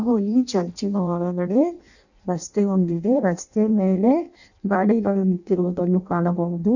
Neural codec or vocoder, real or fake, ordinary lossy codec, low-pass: codec, 16 kHz in and 24 kHz out, 0.6 kbps, FireRedTTS-2 codec; fake; none; 7.2 kHz